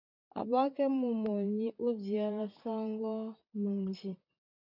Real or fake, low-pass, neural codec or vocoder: fake; 5.4 kHz; vocoder, 44.1 kHz, 128 mel bands, Pupu-Vocoder